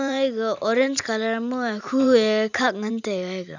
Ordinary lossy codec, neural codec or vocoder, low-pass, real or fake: none; vocoder, 44.1 kHz, 128 mel bands every 512 samples, BigVGAN v2; 7.2 kHz; fake